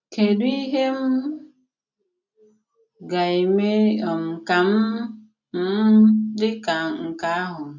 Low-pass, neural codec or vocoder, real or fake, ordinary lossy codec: 7.2 kHz; none; real; none